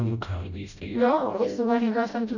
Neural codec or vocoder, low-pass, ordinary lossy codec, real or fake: codec, 16 kHz, 0.5 kbps, FreqCodec, smaller model; 7.2 kHz; none; fake